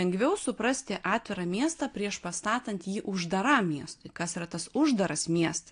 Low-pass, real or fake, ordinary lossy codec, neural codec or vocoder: 9.9 kHz; real; AAC, 48 kbps; none